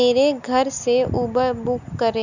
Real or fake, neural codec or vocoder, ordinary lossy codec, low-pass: real; none; none; 7.2 kHz